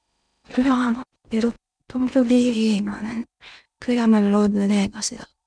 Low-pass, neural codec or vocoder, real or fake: 9.9 kHz; codec, 16 kHz in and 24 kHz out, 0.6 kbps, FocalCodec, streaming, 2048 codes; fake